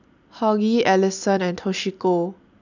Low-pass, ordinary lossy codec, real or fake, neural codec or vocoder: 7.2 kHz; none; real; none